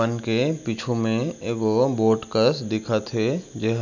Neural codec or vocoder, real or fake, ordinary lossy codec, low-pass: none; real; none; 7.2 kHz